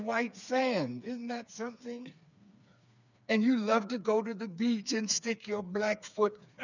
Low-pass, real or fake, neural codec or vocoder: 7.2 kHz; fake; codec, 16 kHz, 4 kbps, FreqCodec, smaller model